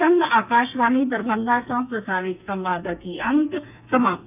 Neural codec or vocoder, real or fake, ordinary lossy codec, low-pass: codec, 32 kHz, 1.9 kbps, SNAC; fake; none; 3.6 kHz